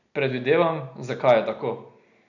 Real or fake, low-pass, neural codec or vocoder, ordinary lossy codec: real; 7.2 kHz; none; none